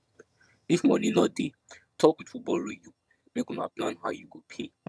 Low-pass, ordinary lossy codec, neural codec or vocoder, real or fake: none; none; vocoder, 22.05 kHz, 80 mel bands, HiFi-GAN; fake